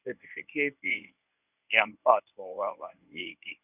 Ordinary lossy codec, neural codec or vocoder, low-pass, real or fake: none; codec, 24 kHz, 0.9 kbps, WavTokenizer, medium speech release version 1; 3.6 kHz; fake